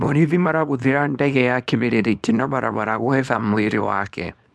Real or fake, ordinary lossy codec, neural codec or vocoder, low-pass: fake; none; codec, 24 kHz, 0.9 kbps, WavTokenizer, small release; none